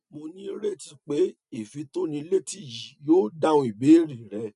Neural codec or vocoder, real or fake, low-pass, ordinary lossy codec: none; real; 10.8 kHz; none